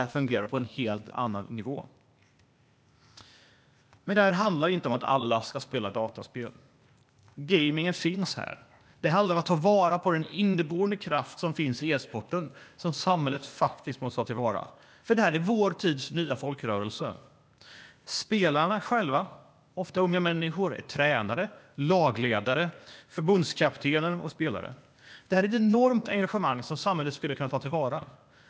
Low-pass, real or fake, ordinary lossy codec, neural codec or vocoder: none; fake; none; codec, 16 kHz, 0.8 kbps, ZipCodec